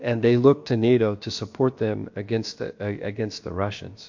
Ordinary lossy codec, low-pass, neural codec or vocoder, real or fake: MP3, 48 kbps; 7.2 kHz; codec, 16 kHz, about 1 kbps, DyCAST, with the encoder's durations; fake